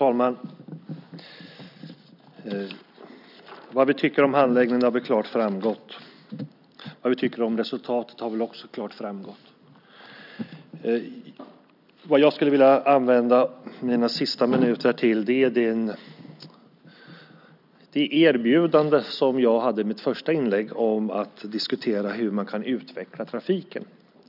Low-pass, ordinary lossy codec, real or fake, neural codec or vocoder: 5.4 kHz; none; real; none